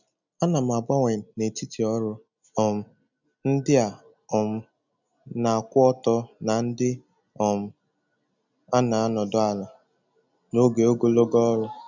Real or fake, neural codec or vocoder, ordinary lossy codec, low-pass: real; none; none; 7.2 kHz